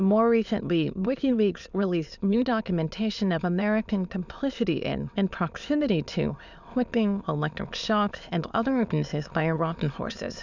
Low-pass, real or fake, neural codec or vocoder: 7.2 kHz; fake; autoencoder, 22.05 kHz, a latent of 192 numbers a frame, VITS, trained on many speakers